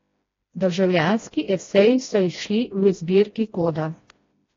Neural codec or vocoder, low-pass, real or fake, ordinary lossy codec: codec, 16 kHz, 1 kbps, FreqCodec, smaller model; 7.2 kHz; fake; AAC, 32 kbps